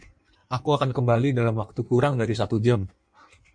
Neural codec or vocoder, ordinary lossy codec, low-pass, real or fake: codec, 16 kHz in and 24 kHz out, 1.1 kbps, FireRedTTS-2 codec; MP3, 48 kbps; 9.9 kHz; fake